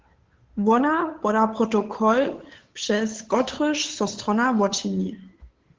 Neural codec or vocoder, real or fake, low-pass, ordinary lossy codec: codec, 16 kHz, 8 kbps, FunCodec, trained on Chinese and English, 25 frames a second; fake; 7.2 kHz; Opus, 16 kbps